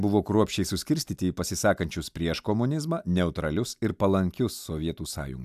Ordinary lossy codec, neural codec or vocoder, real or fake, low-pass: AAC, 96 kbps; none; real; 14.4 kHz